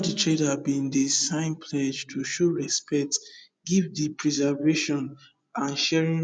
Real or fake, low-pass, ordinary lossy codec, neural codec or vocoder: fake; 9.9 kHz; none; vocoder, 24 kHz, 100 mel bands, Vocos